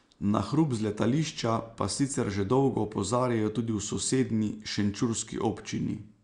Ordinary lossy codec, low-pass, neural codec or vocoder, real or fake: Opus, 64 kbps; 9.9 kHz; none; real